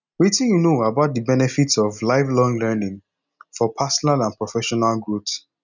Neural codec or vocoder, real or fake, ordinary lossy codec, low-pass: none; real; none; 7.2 kHz